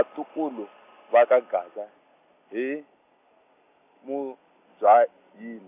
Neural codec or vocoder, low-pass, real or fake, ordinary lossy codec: none; 3.6 kHz; real; none